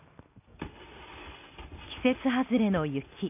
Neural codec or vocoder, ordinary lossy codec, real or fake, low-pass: none; none; real; 3.6 kHz